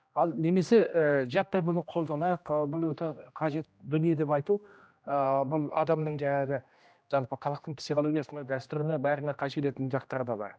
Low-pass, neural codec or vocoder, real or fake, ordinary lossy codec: none; codec, 16 kHz, 1 kbps, X-Codec, HuBERT features, trained on general audio; fake; none